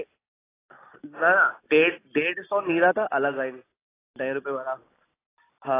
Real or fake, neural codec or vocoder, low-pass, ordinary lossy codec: real; none; 3.6 kHz; AAC, 16 kbps